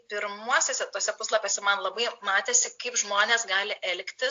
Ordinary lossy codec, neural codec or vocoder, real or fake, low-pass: AAC, 48 kbps; none; real; 7.2 kHz